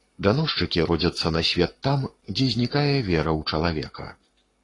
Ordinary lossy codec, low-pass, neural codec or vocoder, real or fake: AAC, 32 kbps; 10.8 kHz; codec, 44.1 kHz, 7.8 kbps, Pupu-Codec; fake